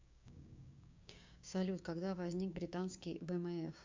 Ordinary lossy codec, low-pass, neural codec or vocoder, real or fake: MP3, 64 kbps; 7.2 kHz; codec, 16 kHz, 6 kbps, DAC; fake